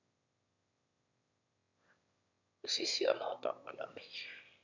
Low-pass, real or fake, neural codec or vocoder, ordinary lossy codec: 7.2 kHz; fake; autoencoder, 22.05 kHz, a latent of 192 numbers a frame, VITS, trained on one speaker; none